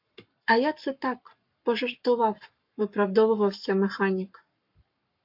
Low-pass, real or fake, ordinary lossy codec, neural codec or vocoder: 5.4 kHz; fake; MP3, 48 kbps; codec, 44.1 kHz, 7.8 kbps, Pupu-Codec